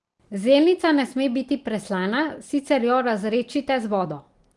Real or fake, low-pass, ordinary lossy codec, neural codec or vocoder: real; 10.8 kHz; Opus, 24 kbps; none